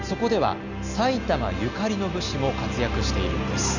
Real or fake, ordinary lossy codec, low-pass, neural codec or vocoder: real; none; 7.2 kHz; none